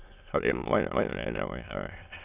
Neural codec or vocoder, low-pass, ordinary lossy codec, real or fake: autoencoder, 22.05 kHz, a latent of 192 numbers a frame, VITS, trained on many speakers; 3.6 kHz; Opus, 64 kbps; fake